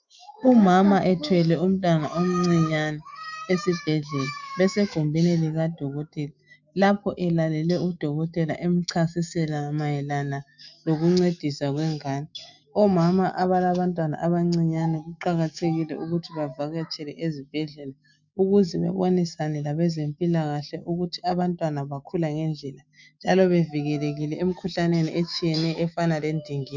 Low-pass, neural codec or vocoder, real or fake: 7.2 kHz; autoencoder, 48 kHz, 128 numbers a frame, DAC-VAE, trained on Japanese speech; fake